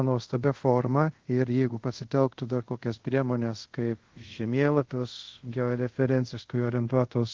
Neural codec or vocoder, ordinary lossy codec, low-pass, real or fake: codec, 24 kHz, 0.5 kbps, DualCodec; Opus, 16 kbps; 7.2 kHz; fake